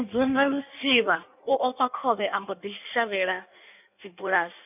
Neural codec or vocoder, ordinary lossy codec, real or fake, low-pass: codec, 16 kHz in and 24 kHz out, 1.1 kbps, FireRedTTS-2 codec; none; fake; 3.6 kHz